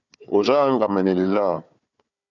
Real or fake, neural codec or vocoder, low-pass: fake; codec, 16 kHz, 4 kbps, FunCodec, trained on Chinese and English, 50 frames a second; 7.2 kHz